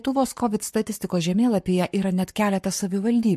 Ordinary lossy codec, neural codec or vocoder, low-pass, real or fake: MP3, 64 kbps; codec, 44.1 kHz, 7.8 kbps, Pupu-Codec; 14.4 kHz; fake